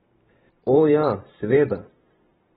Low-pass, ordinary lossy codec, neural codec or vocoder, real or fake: 19.8 kHz; AAC, 16 kbps; vocoder, 44.1 kHz, 128 mel bands every 512 samples, BigVGAN v2; fake